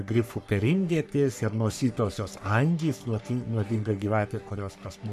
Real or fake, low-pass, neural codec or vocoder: fake; 14.4 kHz; codec, 44.1 kHz, 3.4 kbps, Pupu-Codec